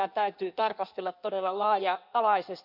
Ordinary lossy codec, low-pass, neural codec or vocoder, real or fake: none; 5.4 kHz; codec, 16 kHz in and 24 kHz out, 1.1 kbps, FireRedTTS-2 codec; fake